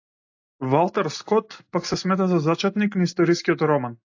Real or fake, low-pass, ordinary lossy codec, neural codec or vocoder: real; 7.2 kHz; AAC, 48 kbps; none